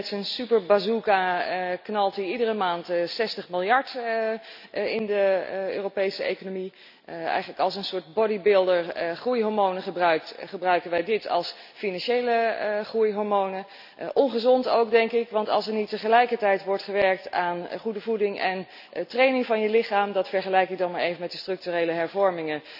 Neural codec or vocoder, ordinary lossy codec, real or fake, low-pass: none; none; real; 5.4 kHz